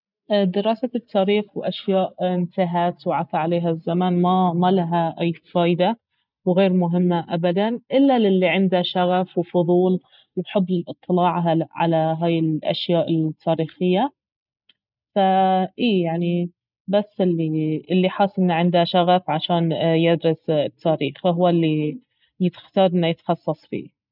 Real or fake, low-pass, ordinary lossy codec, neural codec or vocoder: real; 5.4 kHz; none; none